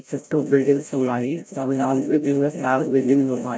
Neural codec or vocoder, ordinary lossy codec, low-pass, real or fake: codec, 16 kHz, 0.5 kbps, FreqCodec, larger model; none; none; fake